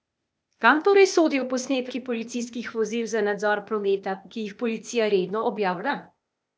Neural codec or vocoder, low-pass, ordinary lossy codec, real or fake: codec, 16 kHz, 0.8 kbps, ZipCodec; none; none; fake